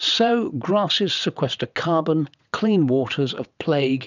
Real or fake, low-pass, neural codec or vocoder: fake; 7.2 kHz; vocoder, 22.05 kHz, 80 mel bands, WaveNeXt